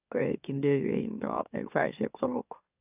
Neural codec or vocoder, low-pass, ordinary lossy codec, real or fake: autoencoder, 44.1 kHz, a latent of 192 numbers a frame, MeloTTS; 3.6 kHz; none; fake